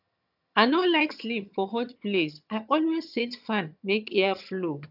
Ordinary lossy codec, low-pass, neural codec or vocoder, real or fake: AAC, 48 kbps; 5.4 kHz; vocoder, 22.05 kHz, 80 mel bands, HiFi-GAN; fake